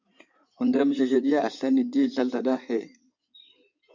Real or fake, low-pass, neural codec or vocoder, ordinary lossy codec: fake; 7.2 kHz; codec, 16 kHz in and 24 kHz out, 2.2 kbps, FireRedTTS-2 codec; MP3, 64 kbps